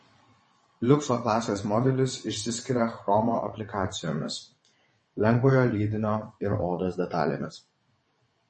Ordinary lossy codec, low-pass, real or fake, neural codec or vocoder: MP3, 32 kbps; 9.9 kHz; fake; vocoder, 22.05 kHz, 80 mel bands, WaveNeXt